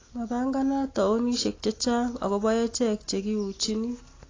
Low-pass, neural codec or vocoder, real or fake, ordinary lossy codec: 7.2 kHz; none; real; AAC, 32 kbps